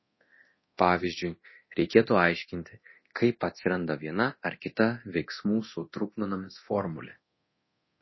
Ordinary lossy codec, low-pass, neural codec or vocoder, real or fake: MP3, 24 kbps; 7.2 kHz; codec, 24 kHz, 0.9 kbps, DualCodec; fake